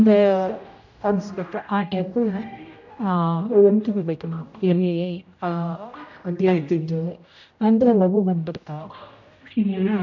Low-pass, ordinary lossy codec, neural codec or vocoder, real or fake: 7.2 kHz; none; codec, 16 kHz, 0.5 kbps, X-Codec, HuBERT features, trained on general audio; fake